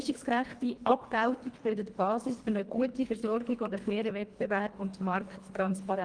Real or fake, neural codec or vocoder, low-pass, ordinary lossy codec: fake; codec, 24 kHz, 1.5 kbps, HILCodec; 9.9 kHz; Opus, 24 kbps